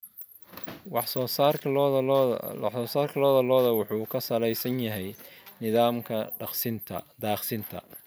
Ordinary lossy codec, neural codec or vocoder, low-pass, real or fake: none; none; none; real